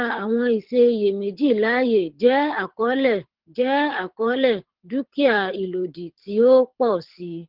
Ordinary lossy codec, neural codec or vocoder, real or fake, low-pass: Opus, 16 kbps; codec, 24 kHz, 6 kbps, HILCodec; fake; 5.4 kHz